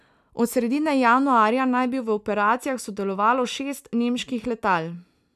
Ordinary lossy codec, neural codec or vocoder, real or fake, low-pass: none; none; real; 14.4 kHz